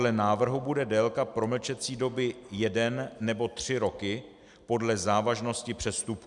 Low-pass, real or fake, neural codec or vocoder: 10.8 kHz; real; none